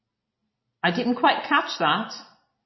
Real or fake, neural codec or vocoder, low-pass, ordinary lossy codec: real; none; 7.2 kHz; MP3, 24 kbps